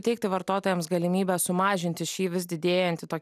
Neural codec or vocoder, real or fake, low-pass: none; real; 14.4 kHz